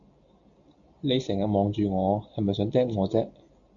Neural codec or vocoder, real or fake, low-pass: none; real; 7.2 kHz